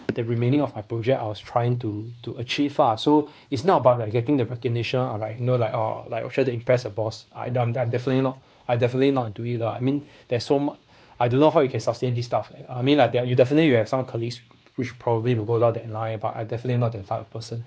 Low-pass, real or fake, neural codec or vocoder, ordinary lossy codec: none; fake; codec, 16 kHz, 2 kbps, X-Codec, WavLM features, trained on Multilingual LibriSpeech; none